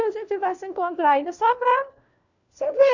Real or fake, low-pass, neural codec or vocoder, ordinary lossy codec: fake; 7.2 kHz; codec, 16 kHz, 1 kbps, FunCodec, trained on LibriTTS, 50 frames a second; none